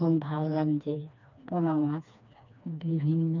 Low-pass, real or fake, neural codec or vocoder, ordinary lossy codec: 7.2 kHz; fake; codec, 16 kHz, 2 kbps, FreqCodec, smaller model; none